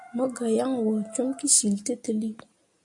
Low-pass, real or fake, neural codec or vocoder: 10.8 kHz; real; none